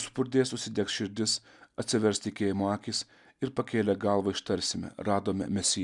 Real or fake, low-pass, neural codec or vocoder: real; 10.8 kHz; none